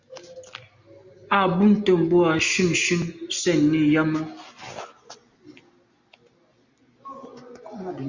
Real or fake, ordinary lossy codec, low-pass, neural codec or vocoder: real; Opus, 64 kbps; 7.2 kHz; none